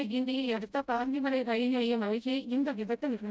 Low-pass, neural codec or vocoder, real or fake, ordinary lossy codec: none; codec, 16 kHz, 0.5 kbps, FreqCodec, smaller model; fake; none